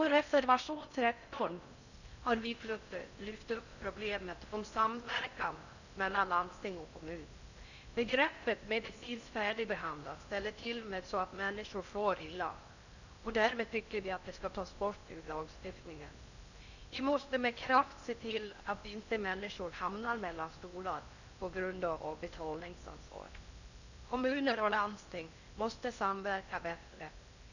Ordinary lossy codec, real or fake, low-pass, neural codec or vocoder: none; fake; 7.2 kHz; codec, 16 kHz in and 24 kHz out, 0.6 kbps, FocalCodec, streaming, 4096 codes